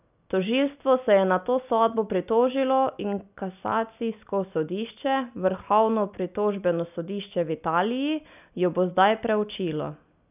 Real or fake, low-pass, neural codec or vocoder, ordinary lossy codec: real; 3.6 kHz; none; none